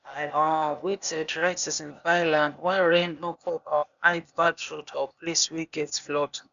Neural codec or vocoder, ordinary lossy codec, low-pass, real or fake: codec, 16 kHz, 0.8 kbps, ZipCodec; none; 7.2 kHz; fake